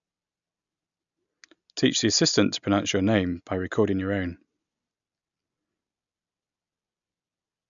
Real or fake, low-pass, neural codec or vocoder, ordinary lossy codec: real; 7.2 kHz; none; none